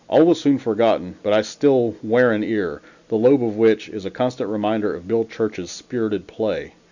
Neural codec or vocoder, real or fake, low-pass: none; real; 7.2 kHz